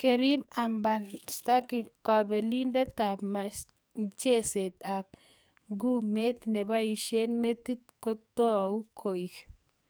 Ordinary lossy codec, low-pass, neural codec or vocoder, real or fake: none; none; codec, 44.1 kHz, 2.6 kbps, SNAC; fake